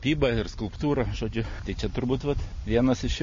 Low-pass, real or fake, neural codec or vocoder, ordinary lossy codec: 7.2 kHz; real; none; MP3, 32 kbps